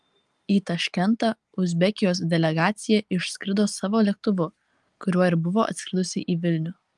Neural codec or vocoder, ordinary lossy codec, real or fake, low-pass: none; Opus, 32 kbps; real; 10.8 kHz